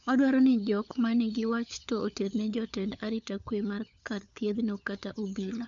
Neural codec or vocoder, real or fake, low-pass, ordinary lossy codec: codec, 16 kHz, 4 kbps, FunCodec, trained on LibriTTS, 50 frames a second; fake; 7.2 kHz; none